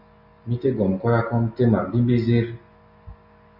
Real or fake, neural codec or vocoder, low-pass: real; none; 5.4 kHz